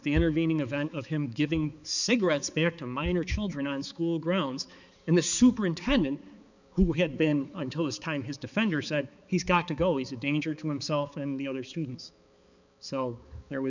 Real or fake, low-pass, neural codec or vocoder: fake; 7.2 kHz; codec, 16 kHz, 4 kbps, X-Codec, HuBERT features, trained on balanced general audio